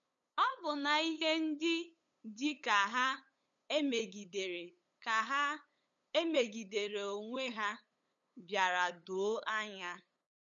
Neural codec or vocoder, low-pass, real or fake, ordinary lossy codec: codec, 16 kHz, 8 kbps, FunCodec, trained on LibriTTS, 25 frames a second; 7.2 kHz; fake; none